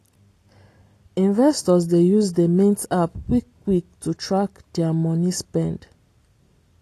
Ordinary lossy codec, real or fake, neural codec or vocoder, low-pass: AAC, 48 kbps; real; none; 14.4 kHz